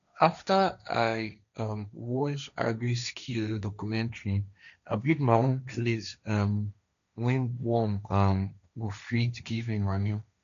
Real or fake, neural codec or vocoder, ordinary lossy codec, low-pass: fake; codec, 16 kHz, 1.1 kbps, Voila-Tokenizer; none; 7.2 kHz